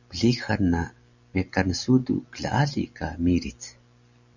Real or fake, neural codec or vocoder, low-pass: real; none; 7.2 kHz